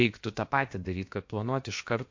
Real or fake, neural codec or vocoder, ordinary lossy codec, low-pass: fake; codec, 16 kHz, about 1 kbps, DyCAST, with the encoder's durations; MP3, 48 kbps; 7.2 kHz